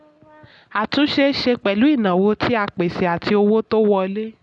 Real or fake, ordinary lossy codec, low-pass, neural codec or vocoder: real; none; 10.8 kHz; none